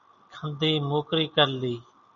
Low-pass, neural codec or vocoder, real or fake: 7.2 kHz; none; real